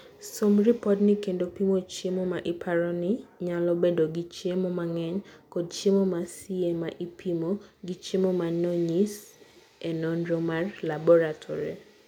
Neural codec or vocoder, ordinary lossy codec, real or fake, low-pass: none; none; real; 19.8 kHz